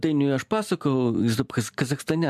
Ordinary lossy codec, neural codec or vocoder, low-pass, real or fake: MP3, 96 kbps; none; 14.4 kHz; real